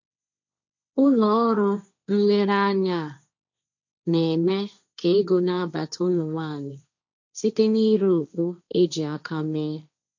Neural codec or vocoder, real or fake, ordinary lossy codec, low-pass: codec, 16 kHz, 1.1 kbps, Voila-Tokenizer; fake; none; 7.2 kHz